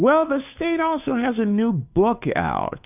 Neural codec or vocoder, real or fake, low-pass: codec, 16 kHz, 2 kbps, FunCodec, trained on Chinese and English, 25 frames a second; fake; 3.6 kHz